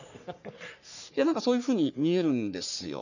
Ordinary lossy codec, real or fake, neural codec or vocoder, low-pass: none; fake; codec, 44.1 kHz, 3.4 kbps, Pupu-Codec; 7.2 kHz